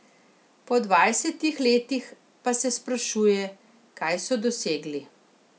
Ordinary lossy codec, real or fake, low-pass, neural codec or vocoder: none; real; none; none